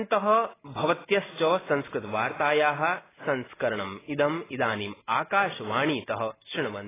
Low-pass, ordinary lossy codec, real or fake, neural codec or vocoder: 3.6 kHz; AAC, 16 kbps; real; none